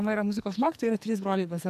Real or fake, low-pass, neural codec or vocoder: fake; 14.4 kHz; codec, 44.1 kHz, 2.6 kbps, SNAC